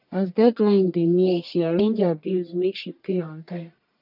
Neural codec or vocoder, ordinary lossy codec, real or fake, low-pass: codec, 44.1 kHz, 1.7 kbps, Pupu-Codec; none; fake; 5.4 kHz